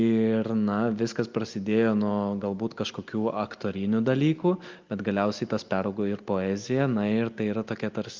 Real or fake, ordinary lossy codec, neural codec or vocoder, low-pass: real; Opus, 24 kbps; none; 7.2 kHz